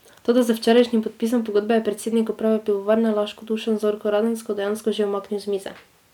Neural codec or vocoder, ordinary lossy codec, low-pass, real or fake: none; none; 19.8 kHz; real